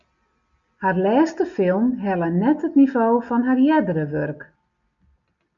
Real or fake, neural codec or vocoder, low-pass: real; none; 7.2 kHz